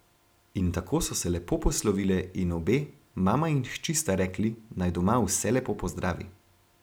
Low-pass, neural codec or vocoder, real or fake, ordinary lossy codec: none; none; real; none